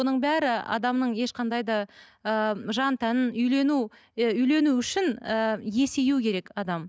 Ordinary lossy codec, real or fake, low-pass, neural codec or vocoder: none; real; none; none